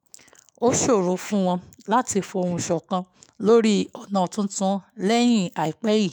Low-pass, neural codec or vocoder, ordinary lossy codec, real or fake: none; autoencoder, 48 kHz, 128 numbers a frame, DAC-VAE, trained on Japanese speech; none; fake